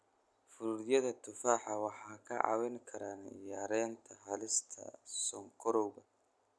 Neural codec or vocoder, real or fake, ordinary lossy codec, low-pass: none; real; none; none